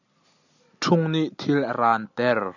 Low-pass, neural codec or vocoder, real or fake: 7.2 kHz; none; real